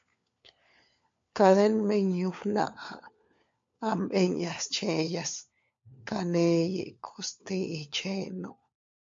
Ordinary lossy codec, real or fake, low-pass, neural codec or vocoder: MP3, 48 kbps; fake; 7.2 kHz; codec, 16 kHz, 4 kbps, FunCodec, trained on LibriTTS, 50 frames a second